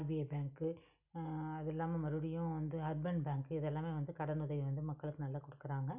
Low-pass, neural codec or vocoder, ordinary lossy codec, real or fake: 3.6 kHz; none; none; real